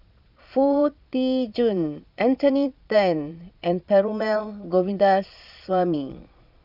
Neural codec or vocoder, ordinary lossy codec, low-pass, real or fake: vocoder, 22.05 kHz, 80 mel bands, Vocos; none; 5.4 kHz; fake